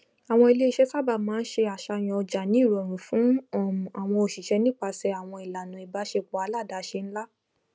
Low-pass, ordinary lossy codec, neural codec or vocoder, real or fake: none; none; none; real